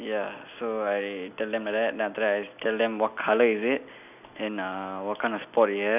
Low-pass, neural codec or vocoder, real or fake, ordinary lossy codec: 3.6 kHz; none; real; none